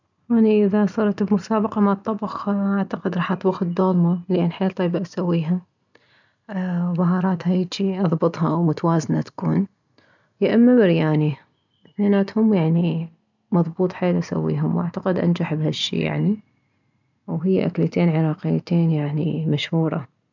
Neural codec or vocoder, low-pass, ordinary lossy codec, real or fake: none; 7.2 kHz; none; real